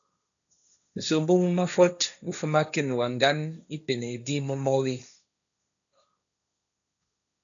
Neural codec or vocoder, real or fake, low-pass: codec, 16 kHz, 1.1 kbps, Voila-Tokenizer; fake; 7.2 kHz